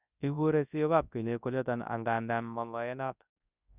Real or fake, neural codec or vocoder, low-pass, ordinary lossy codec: fake; codec, 24 kHz, 0.9 kbps, WavTokenizer, large speech release; 3.6 kHz; none